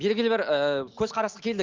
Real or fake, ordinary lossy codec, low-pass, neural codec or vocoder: real; Opus, 24 kbps; 7.2 kHz; none